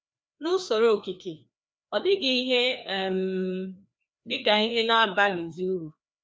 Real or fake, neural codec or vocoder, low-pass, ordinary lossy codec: fake; codec, 16 kHz, 2 kbps, FreqCodec, larger model; none; none